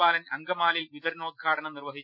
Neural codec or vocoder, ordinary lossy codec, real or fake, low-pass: none; none; real; 5.4 kHz